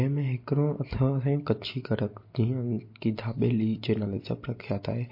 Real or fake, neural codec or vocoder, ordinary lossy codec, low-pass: real; none; MP3, 32 kbps; 5.4 kHz